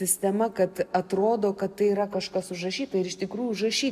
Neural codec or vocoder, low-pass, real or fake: none; 14.4 kHz; real